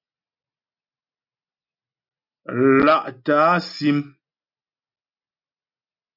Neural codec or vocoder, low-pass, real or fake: none; 5.4 kHz; real